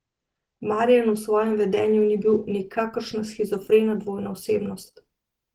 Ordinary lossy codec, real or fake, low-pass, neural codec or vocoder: Opus, 16 kbps; real; 19.8 kHz; none